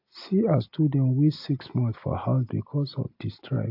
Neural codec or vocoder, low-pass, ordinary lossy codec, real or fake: none; 5.4 kHz; AAC, 48 kbps; real